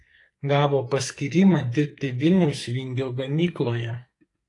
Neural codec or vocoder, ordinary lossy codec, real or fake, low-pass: codec, 44.1 kHz, 2.6 kbps, SNAC; AAC, 48 kbps; fake; 10.8 kHz